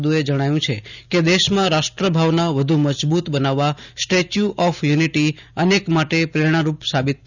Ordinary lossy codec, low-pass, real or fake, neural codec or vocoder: none; 7.2 kHz; real; none